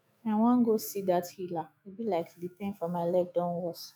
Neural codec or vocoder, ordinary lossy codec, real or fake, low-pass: autoencoder, 48 kHz, 128 numbers a frame, DAC-VAE, trained on Japanese speech; none; fake; none